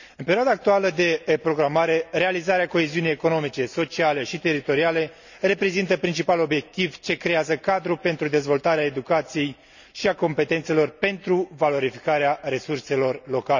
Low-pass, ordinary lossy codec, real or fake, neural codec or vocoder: 7.2 kHz; none; real; none